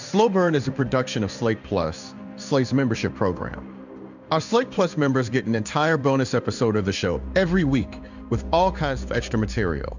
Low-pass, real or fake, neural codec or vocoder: 7.2 kHz; fake; codec, 16 kHz in and 24 kHz out, 1 kbps, XY-Tokenizer